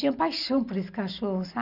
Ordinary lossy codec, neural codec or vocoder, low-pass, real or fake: none; none; 5.4 kHz; real